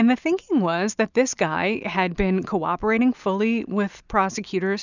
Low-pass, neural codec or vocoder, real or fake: 7.2 kHz; none; real